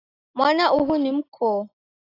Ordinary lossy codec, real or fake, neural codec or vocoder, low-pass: AAC, 24 kbps; real; none; 5.4 kHz